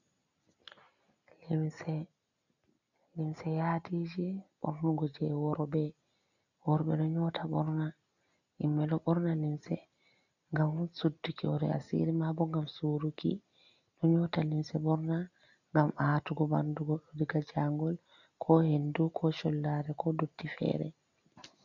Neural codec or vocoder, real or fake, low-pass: none; real; 7.2 kHz